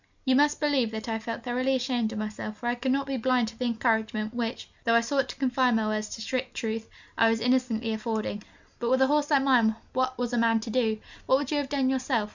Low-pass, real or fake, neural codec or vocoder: 7.2 kHz; real; none